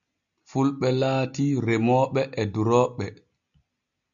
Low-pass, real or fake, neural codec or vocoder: 7.2 kHz; real; none